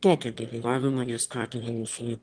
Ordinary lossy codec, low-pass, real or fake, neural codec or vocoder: Opus, 24 kbps; 9.9 kHz; fake; autoencoder, 22.05 kHz, a latent of 192 numbers a frame, VITS, trained on one speaker